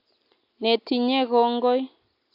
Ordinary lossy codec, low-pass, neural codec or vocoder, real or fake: AAC, 32 kbps; 5.4 kHz; none; real